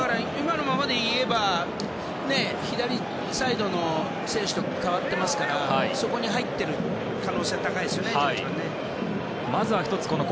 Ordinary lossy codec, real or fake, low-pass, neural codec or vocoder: none; real; none; none